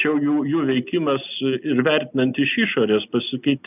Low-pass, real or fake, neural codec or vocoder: 3.6 kHz; real; none